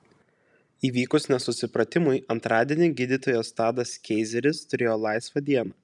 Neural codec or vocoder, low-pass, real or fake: none; 10.8 kHz; real